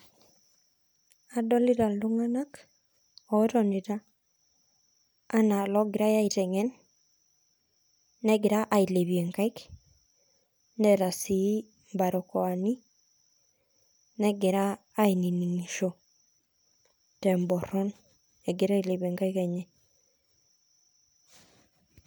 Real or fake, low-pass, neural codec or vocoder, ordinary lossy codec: real; none; none; none